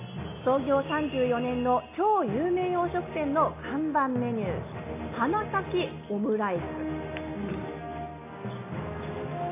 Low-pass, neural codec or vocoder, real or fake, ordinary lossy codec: 3.6 kHz; none; real; AAC, 24 kbps